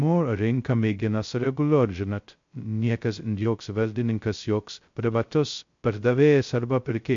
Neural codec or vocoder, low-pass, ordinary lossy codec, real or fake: codec, 16 kHz, 0.2 kbps, FocalCodec; 7.2 kHz; MP3, 48 kbps; fake